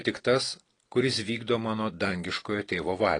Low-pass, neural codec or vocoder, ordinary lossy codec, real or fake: 9.9 kHz; none; AAC, 32 kbps; real